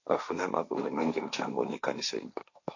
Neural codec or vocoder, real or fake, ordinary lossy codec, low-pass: codec, 16 kHz, 1.1 kbps, Voila-Tokenizer; fake; AAC, 48 kbps; 7.2 kHz